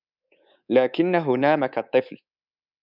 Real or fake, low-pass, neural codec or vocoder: fake; 5.4 kHz; codec, 24 kHz, 3.1 kbps, DualCodec